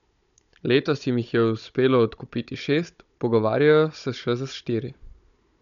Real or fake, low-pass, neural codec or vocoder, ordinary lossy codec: fake; 7.2 kHz; codec, 16 kHz, 16 kbps, FunCodec, trained on Chinese and English, 50 frames a second; none